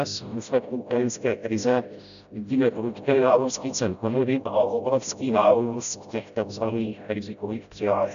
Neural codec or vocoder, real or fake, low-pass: codec, 16 kHz, 0.5 kbps, FreqCodec, smaller model; fake; 7.2 kHz